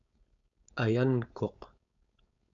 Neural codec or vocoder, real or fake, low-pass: codec, 16 kHz, 4.8 kbps, FACodec; fake; 7.2 kHz